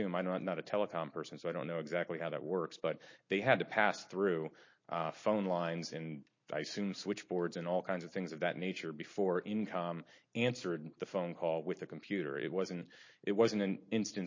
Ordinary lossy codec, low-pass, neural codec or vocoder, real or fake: MP3, 32 kbps; 7.2 kHz; none; real